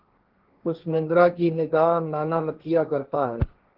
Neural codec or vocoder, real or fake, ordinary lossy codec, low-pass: codec, 16 kHz, 1.1 kbps, Voila-Tokenizer; fake; Opus, 16 kbps; 5.4 kHz